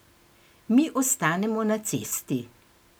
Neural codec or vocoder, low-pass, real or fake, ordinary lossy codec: none; none; real; none